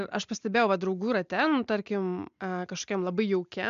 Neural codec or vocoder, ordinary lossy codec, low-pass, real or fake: none; MP3, 64 kbps; 7.2 kHz; real